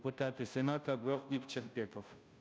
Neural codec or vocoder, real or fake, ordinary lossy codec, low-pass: codec, 16 kHz, 0.5 kbps, FunCodec, trained on Chinese and English, 25 frames a second; fake; none; none